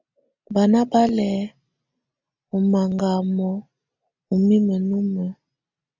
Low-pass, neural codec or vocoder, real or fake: 7.2 kHz; none; real